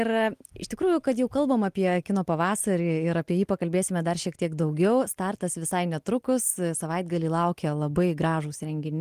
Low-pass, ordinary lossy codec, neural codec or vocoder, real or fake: 14.4 kHz; Opus, 24 kbps; none; real